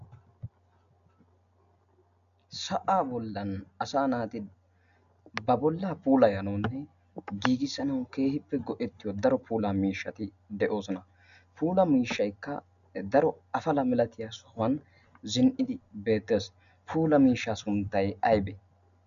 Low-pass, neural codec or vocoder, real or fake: 7.2 kHz; none; real